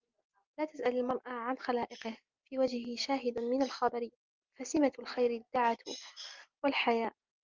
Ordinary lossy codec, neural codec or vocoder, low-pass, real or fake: Opus, 32 kbps; none; 7.2 kHz; real